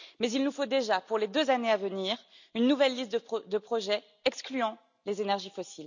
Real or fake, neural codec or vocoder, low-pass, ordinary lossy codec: real; none; 7.2 kHz; none